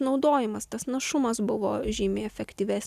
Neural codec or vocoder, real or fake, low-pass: none; real; 14.4 kHz